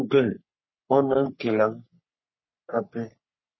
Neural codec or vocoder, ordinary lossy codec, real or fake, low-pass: codec, 44.1 kHz, 3.4 kbps, Pupu-Codec; MP3, 24 kbps; fake; 7.2 kHz